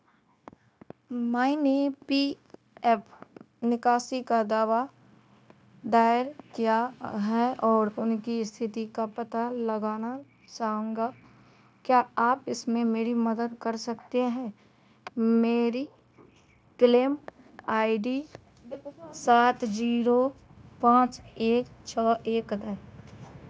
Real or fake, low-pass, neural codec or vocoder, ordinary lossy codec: fake; none; codec, 16 kHz, 0.9 kbps, LongCat-Audio-Codec; none